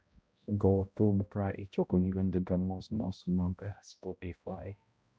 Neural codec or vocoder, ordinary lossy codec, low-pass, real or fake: codec, 16 kHz, 0.5 kbps, X-Codec, HuBERT features, trained on balanced general audio; none; none; fake